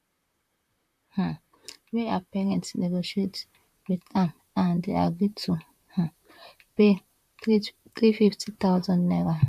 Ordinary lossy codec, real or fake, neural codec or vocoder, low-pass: none; fake; vocoder, 44.1 kHz, 128 mel bands, Pupu-Vocoder; 14.4 kHz